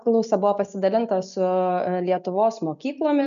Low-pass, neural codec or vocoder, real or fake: 7.2 kHz; none; real